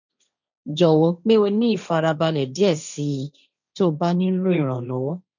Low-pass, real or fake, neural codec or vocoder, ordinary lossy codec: 7.2 kHz; fake; codec, 16 kHz, 1.1 kbps, Voila-Tokenizer; none